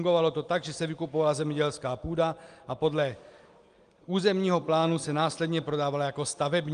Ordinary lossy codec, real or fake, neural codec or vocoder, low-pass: Opus, 32 kbps; real; none; 9.9 kHz